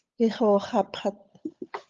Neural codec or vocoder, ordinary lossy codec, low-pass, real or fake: codec, 16 kHz, 8 kbps, FunCodec, trained on Chinese and English, 25 frames a second; Opus, 24 kbps; 7.2 kHz; fake